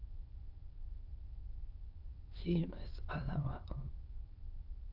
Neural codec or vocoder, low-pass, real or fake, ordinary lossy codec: autoencoder, 22.05 kHz, a latent of 192 numbers a frame, VITS, trained on many speakers; 5.4 kHz; fake; Opus, 24 kbps